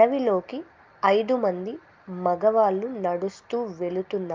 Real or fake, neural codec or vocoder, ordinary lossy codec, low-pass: real; none; Opus, 24 kbps; 7.2 kHz